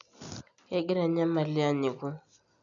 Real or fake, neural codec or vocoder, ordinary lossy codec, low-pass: real; none; none; 7.2 kHz